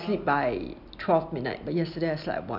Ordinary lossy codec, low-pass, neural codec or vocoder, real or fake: none; 5.4 kHz; none; real